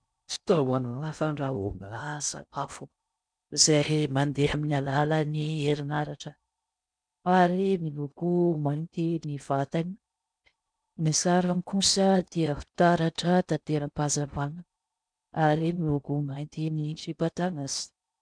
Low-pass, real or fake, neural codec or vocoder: 9.9 kHz; fake; codec, 16 kHz in and 24 kHz out, 0.6 kbps, FocalCodec, streaming, 4096 codes